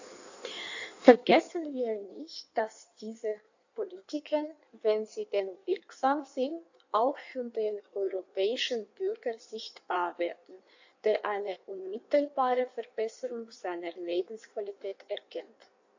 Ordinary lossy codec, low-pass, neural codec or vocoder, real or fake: AAC, 48 kbps; 7.2 kHz; codec, 16 kHz in and 24 kHz out, 1.1 kbps, FireRedTTS-2 codec; fake